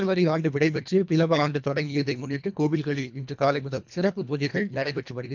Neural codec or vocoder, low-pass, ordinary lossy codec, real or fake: codec, 24 kHz, 1.5 kbps, HILCodec; 7.2 kHz; none; fake